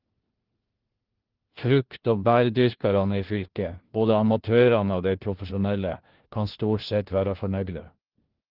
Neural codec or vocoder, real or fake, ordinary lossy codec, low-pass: codec, 16 kHz, 1 kbps, FunCodec, trained on LibriTTS, 50 frames a second; fake; Opus, 16 kbps; 5.4 kHz